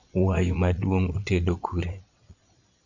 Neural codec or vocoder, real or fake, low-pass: vocoder, 22.05 kHz, 80 mel bands, Vocos; fake; 7.2 kHz